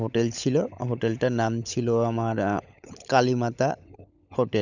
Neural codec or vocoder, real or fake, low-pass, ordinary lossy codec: codec, 16 kHz, 16 kbps, FunCodec, trained on LibriTTS, 50 frames a second; fake; 7.2 kHz; none